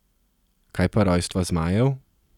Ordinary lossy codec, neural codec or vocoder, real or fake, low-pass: none; none; real; 19.8 kHz